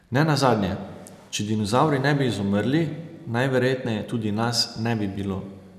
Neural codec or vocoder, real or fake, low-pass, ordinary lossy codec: none; real; 14.4 kHz; none